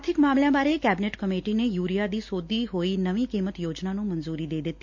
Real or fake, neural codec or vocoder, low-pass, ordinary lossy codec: real; none; 7.2 kHz; MP3, 48 kbps